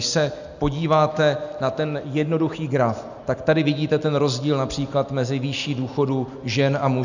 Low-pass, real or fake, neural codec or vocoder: 7.2 kHz; real; none